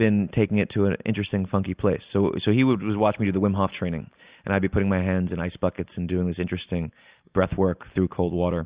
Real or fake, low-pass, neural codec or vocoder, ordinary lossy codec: real; 3.6 kHz; none; Opus, 64 kbps